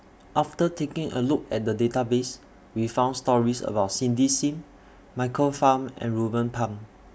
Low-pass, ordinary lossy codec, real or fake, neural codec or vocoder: none; none; real; none